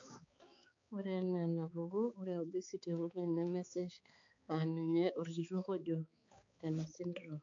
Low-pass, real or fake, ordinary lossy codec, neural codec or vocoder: 7.2 kHz; fake; AAC, 48 kbps; codec, 16 kHz, 4 kbps, X-Codec, HuBERT features, trained on balanced general audio